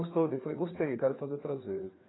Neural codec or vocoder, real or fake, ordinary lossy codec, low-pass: codec, 16 kHz, 4 kbps, FreqCodec, larger model; fake; AAC, 16 kbps; 7.2 kHz